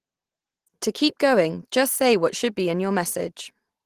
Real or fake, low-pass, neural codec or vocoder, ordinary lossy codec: real; 14.4 kHz; none; Opus, 16 kbps